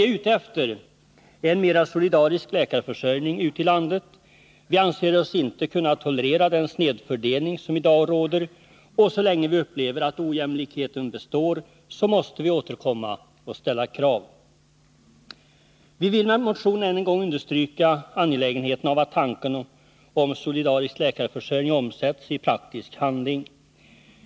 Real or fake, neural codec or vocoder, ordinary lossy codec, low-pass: real; none; none; none